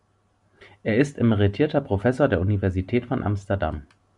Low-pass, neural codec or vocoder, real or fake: 10.8 kHz; none; real